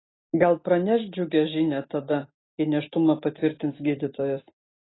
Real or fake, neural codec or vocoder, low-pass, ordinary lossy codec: real; none; 7.2 kHz; AAC, 16 kbps